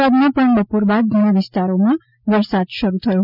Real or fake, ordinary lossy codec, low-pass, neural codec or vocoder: real; none; 5.4 kHz; none